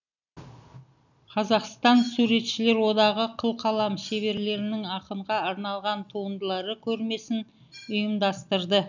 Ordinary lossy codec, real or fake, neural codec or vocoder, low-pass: none; real; none; 7.2 kHz